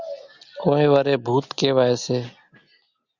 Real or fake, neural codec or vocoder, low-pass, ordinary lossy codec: real; none; 7.2 kHz; Opus, 64 kbps